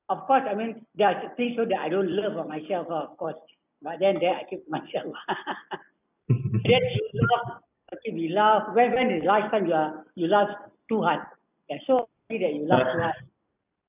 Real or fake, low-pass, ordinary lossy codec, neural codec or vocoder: real; 3.6 kHz; none; none